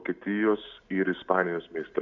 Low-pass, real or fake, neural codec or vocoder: 7.2 kHz; real; none